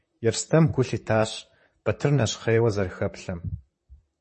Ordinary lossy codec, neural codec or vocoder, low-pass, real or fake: MP3, 32 kbps; vocoder, 44.1 kHz, 128 mel bands, Pupu-Vocoder; 10.8 kHz; fake